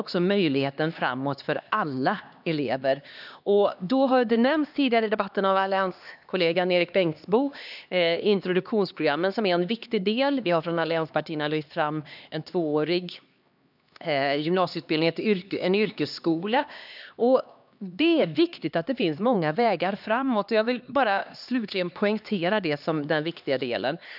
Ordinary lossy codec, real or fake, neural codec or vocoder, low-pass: none; fake; codec, 16 kHz, 2 kbps, X-Codec, HuBERT features, trained on LibriSpeech; 5.4 kHz